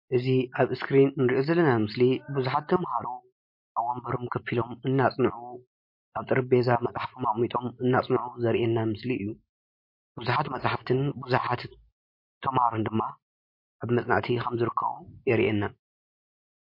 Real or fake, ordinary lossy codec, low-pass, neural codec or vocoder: real; MP3, 32 kbps; 5.4 kHz; none